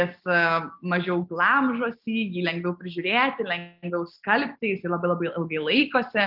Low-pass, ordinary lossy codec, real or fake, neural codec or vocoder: 5.4 kHz; Opus, 24 kbps; real; none